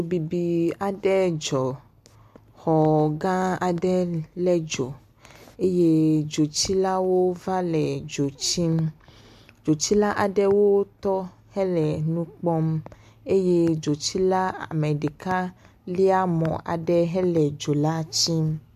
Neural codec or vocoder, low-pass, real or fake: none; 14.4 kHz; real